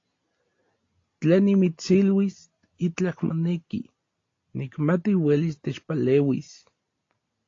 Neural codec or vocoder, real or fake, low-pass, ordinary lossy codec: none; real; 7.2 kHz; AAC, 32 kbps